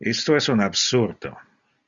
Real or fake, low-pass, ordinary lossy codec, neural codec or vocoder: real; 7.2 kHz; Opus, 64 kbps; none